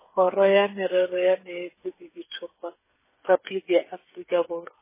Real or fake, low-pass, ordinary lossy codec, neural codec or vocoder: fake; 3.6 kHz; MP3, 16 kbps; codec, 16 kHz, 8 kbps, FreqCodec, smaller model